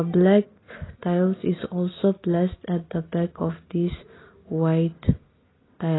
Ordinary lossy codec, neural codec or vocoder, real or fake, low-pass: AAC, 16 kbps; none; real; 7.2 kHz